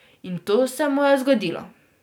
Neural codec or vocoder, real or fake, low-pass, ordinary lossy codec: none; real; none; none